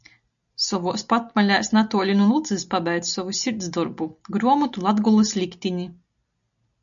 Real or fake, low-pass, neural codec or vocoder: real; 7.2 kHz; none